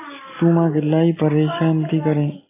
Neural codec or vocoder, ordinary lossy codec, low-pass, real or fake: none; AAC, 16 kbps; 3.6 kHz; real